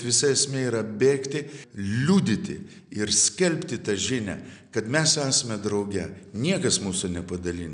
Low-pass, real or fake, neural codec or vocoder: 9.9 kHz; real; none